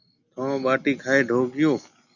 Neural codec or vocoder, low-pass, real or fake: none; 7.2 kHz; real